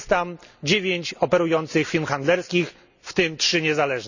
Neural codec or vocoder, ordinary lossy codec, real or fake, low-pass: none; none; real; 7.2 kHz